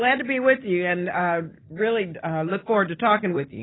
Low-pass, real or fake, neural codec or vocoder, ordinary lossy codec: 7.2 kHz; real; none; AAC, 16 kbps